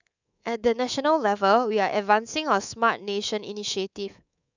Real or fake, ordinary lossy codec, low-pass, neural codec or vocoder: fake; none; 7.2 kHz; codec, 24 kHz, 3.1 kbps, DualCodec